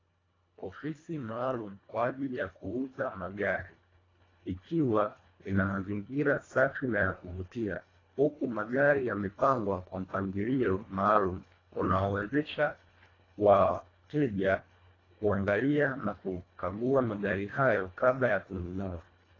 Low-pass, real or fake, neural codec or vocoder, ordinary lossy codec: 7.2 kHz; fake; codec, 24 kHz, 1.5 kbps, HILCodec; AAC, 32 kbps